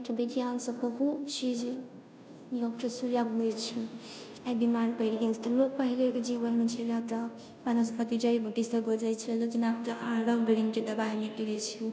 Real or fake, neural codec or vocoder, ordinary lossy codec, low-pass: fake; codec, 16 kHz, 0.5 kbps, FunCodec, trained on Chinese and English, 25 frames a second; none; none